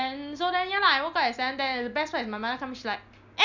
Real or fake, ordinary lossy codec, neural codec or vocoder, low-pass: real; none; none; 7.2 kHz